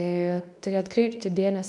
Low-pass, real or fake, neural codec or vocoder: 10.8 kHz; fake; codec, 24 kHz, 0.9 kbps, WavTokenizer, medium speech release version 2